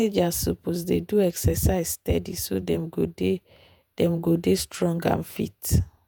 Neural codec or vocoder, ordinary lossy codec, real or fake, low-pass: vocoder, 48 kHz, 128 mel bands, Vocos; none; fake; none